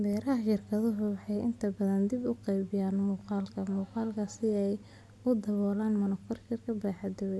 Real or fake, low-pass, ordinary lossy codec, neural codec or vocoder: real; none; none; none